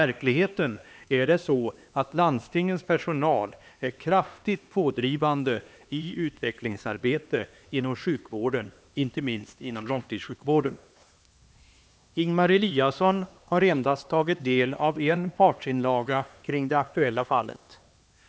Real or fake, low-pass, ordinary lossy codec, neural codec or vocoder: fake; none; none; codec, 16 kHz, 2 kbps, X-Codec, HuBERT features, trained on LibriSpeech